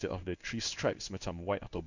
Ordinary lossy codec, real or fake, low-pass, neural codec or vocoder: none; fake; 7.2 kHz; codec, 16 kHz in and 24 kHz out, 1 kbps, XY-Tokenizer